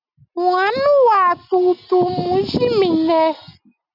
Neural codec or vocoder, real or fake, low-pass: none; real; 5.4 kHz